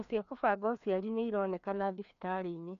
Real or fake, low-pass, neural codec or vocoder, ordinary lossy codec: fake; 7.2 kHz; codec, 16 kHz, 2 kbps, FreqCodec, larger model; none